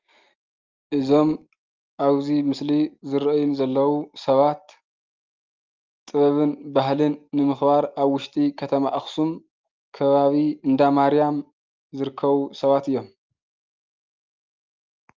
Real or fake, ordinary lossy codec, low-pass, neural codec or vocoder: real; Opus, 24 kbps; 7.2 kHz; none